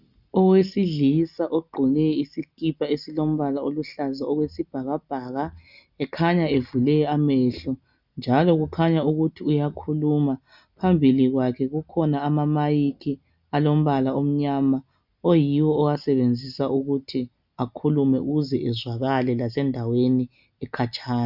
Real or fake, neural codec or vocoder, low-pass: real; none; 5.4 kHz